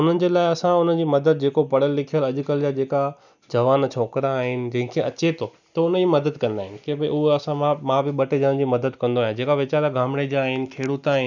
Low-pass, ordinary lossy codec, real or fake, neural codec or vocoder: 7.2 kHz; none; real; none